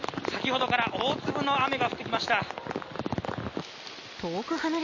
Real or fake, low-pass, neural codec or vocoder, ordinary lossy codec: real; 7.2 kHz; none; MP3, 32 kbps